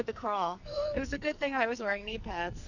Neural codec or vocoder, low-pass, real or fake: codec, 44.1 kHz, 2.6 kbps, SNAC; 7.2 kHz; fake